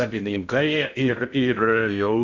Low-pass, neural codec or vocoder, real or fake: 7.2 kHz; codec, 16 kHz in and 24 kHz out, 0.6 kbps, FocalCodec, streaming, 2048 codes; fake